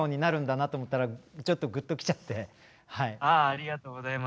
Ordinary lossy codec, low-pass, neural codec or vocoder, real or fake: none; none; none; real